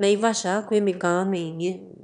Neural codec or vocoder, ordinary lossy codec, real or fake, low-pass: autoencoder, 22.05 kHz, a latent of 192 numbers a frame, VITS, trained on one speaker; MP3, 96 kbps; fake; 9.9 kHz